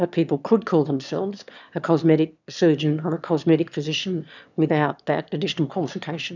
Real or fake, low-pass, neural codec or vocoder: fake; 7.2 kHz; autoencoder, 22.05 kHz, a latent of 192 numbers a frame, VITS, trained on one speaker